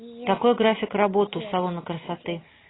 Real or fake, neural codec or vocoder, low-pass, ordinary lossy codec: real; none; 7.2 kHz; AAC, 16 kbps